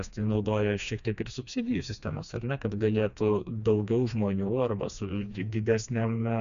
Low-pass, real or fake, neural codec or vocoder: 7.2 kHz; fake; codec, 16 kHz, 2 kbps, FreqCodec, smaller model